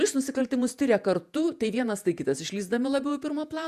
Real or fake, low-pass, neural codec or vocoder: fake; 14.4 kHz; vocoder, 48 kHz, 128 mel bands, Vocos